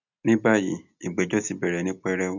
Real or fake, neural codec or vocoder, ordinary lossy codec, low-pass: real; none; none; 7.2 kHz